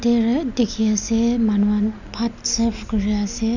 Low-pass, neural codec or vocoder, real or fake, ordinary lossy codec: 7.2 kHz; none; real; none